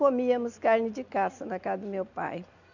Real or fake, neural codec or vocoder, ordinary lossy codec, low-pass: real; none; none; 7.2 kHz